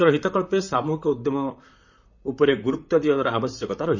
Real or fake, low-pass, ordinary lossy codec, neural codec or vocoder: fake; 7.2 kHz; none; vocoder, 44.1 kHz, 128 mel bands, Pupu-Vocoder